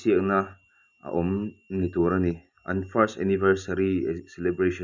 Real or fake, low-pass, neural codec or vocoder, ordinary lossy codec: real; 7.2 kHz; none; none